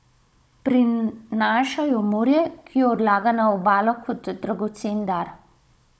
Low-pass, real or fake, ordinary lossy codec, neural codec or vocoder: none; fake; none; codec, 16 kHz, 16 kbps, FunCodec, trained on Chinese and English, 50 frames a second